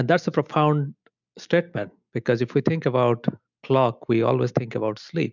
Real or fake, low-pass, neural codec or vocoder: real; 7.2 kHz; none